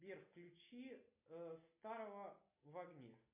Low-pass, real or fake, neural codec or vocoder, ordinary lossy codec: 3.6 kHz; real; none; MP3, 24 kbps